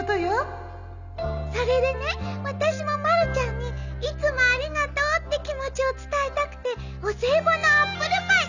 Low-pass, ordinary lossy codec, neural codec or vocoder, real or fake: 7.2 kHz; none; none; real